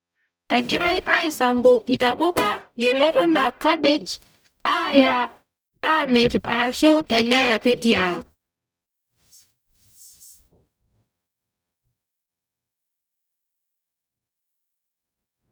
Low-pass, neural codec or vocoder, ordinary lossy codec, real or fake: none; codec, 44.1 kHz, 0.9 kbps, DAC; none; fake